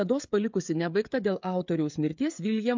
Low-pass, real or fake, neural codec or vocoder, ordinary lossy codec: 7.2 kHz; fake; codec, 16 kHz, 16 kbps, FreqCodec, smaller model; MP3, 64 kbps